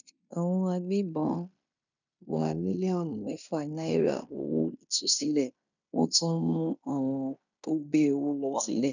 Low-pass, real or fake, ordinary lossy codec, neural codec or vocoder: 7.2 kHz; fake; none; codec, 16 kHz in and 24 kHz out, 0.9 kbps, LongCat-Audio-Codec, fine tuned four codebook decoder